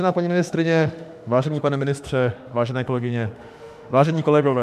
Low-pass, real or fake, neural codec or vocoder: 14.4 kHz; fake; autoencoder, 48 kHz, 32 numbers a frame, DAC-VAE, trained on Japanese speech